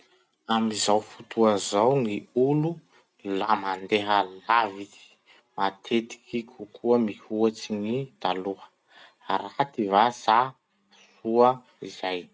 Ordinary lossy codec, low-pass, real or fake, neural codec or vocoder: none; none; real; none